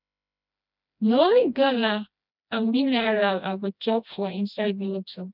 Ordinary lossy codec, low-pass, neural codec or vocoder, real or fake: none; 5.4 kHz; codec, 16 kHz, 1 kbps, FreqCodec, smaller model; fake